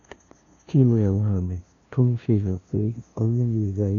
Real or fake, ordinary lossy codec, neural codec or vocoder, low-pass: fake; none; codec, 16 kHz, 0.5 kbps, FunCodec, trained on LibriTTS, 25 frames a second; 7.2 kHz